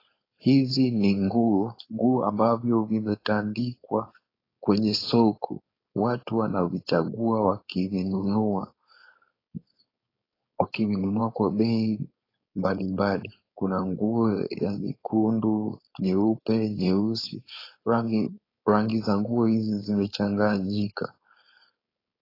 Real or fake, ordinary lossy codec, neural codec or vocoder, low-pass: fake; AAC, 24 kbps; codec, 16 kHz, 4.8 kbps, FACodec; 5.4 kHz